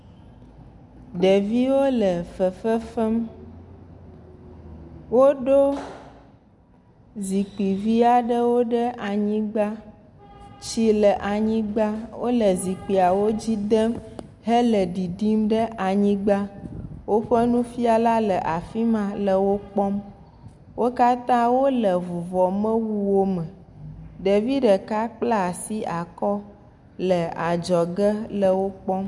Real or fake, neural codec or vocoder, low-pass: real; none; 10.8 kHz